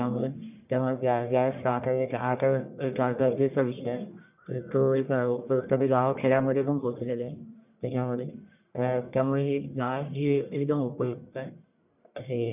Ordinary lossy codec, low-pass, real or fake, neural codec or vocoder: none; 3.6 kHz; fake; codec, 44.1 kHz, 1.7 kbps, Pupu-Codec